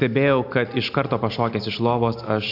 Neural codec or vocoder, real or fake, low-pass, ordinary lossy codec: none; real; 5.4 kHz; AAC, 48 kbps